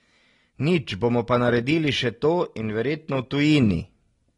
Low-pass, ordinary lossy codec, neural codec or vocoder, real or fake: 10.8 kHz; AAC, 32 kbps; none; real